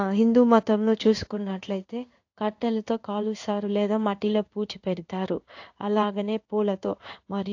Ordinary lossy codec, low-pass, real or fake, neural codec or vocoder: AAC, 48 kbps; 7.2 kHz; fake; codec, 16 kHz in and 24 kHz out, 1 kbps, XY-Tokenizer